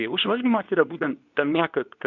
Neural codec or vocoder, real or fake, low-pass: codec, 24 kHz, 0.9 kbps, WavTokenizer, medium speech release version 2; fake; 7.2 kHz